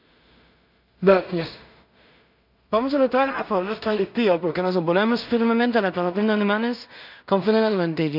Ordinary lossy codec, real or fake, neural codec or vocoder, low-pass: none; fake; codec, 16 kHz in and 24 kHz out, 0.4 kbps, LongCat-Audio-Codec, two codebook decoder; 5.4 kHz